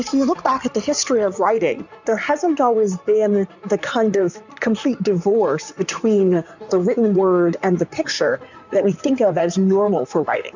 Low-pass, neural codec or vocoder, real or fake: 7.2 kHz; codec, 16 kHz in and 24 kHz out, 2.2 kbps, FireRedTTS-2 codec; fake